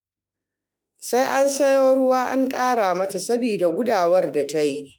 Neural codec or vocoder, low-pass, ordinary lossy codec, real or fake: autoencoder, 48 kHz, 32 numbers a frame, DAC-VAE, trained on Japanese speech; none; none; fake